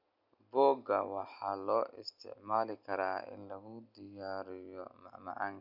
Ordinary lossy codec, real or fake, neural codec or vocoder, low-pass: none; real; none; 5.4 kHz